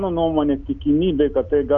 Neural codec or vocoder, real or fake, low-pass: none; real; 7.2 kHz